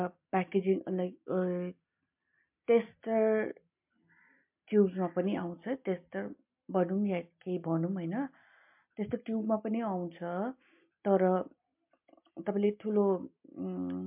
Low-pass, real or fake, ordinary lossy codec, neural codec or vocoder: 3.6 kHz; real; MP3, 32 kbps; none